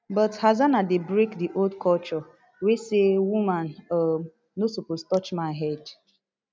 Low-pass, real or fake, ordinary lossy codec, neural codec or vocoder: none; real; none; none